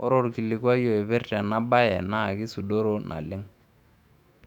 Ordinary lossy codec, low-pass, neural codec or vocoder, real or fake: none; 19.8 kHz; autoencoder, 48 kHz, 128 numbers a frame, DAC-VAE, trained on Japanese speech; fake